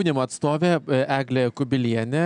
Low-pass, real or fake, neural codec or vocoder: 9.9 kHz; real; none